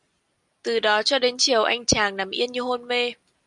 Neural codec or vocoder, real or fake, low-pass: none; real; 10.8 kHz